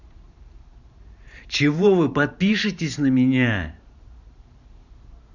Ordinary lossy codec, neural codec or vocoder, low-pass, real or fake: none; vocoder, 44.1 kHz, 128 mel bands every 512 samples, BigVGAN v2; 7.2 kHz; fake